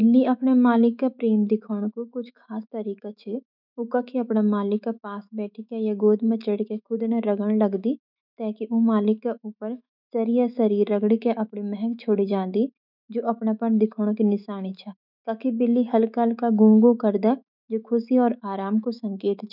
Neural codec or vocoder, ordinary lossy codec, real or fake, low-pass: autoencoder, 48 kHz, 128 numbers a frame, DAC-VAE, trained on Japanese speech; none; fake; 5.4 kHz